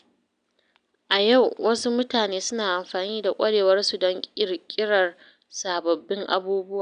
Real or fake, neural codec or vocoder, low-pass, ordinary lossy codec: real; none; 9.9 kHz; none